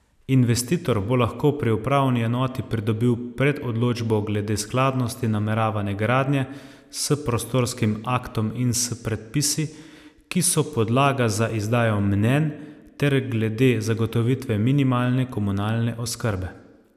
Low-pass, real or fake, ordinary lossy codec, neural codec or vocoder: 14.4 kHz; real; none; none